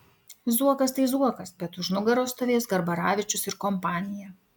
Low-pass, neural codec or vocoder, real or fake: 19.8 kHz; none; real